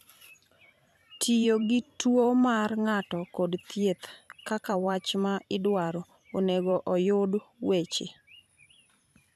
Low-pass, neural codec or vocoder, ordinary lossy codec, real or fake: 14.4 kHz; vocoder, 44.1 kHz, 128 mel bands every 512 samples, BigVGAN v2; none; fake